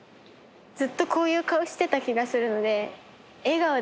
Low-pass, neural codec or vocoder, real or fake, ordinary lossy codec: none; none; real; none